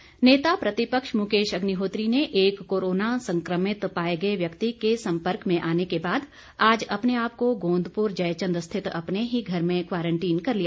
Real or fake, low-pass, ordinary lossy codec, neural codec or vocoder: real; none; none; none